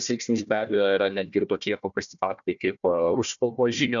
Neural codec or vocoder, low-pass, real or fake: codec, 16 kHz, 1 kbps, FunCodec, trained on Chinese and English, 50 frames a second; 7.2 kHz; fake